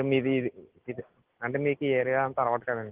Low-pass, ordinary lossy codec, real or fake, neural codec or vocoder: 3.6 kHz; Opus, 16 kbps; real; none